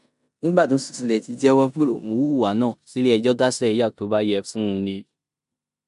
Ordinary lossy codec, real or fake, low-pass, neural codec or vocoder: none; fake; 10.8 kHz; codec, 16 kHz in and 24 kHz out, 0.9 kbps, LongCat-Audio-Codec, four codebook decoder